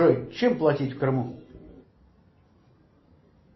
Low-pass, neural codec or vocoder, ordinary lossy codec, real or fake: 7.2 kHz; none; MP3, 24 kbps; real